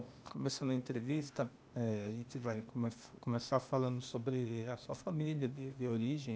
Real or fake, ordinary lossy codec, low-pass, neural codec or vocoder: fake; none; none; codec, 16 kHz, 0.8 kbps, ZipCodec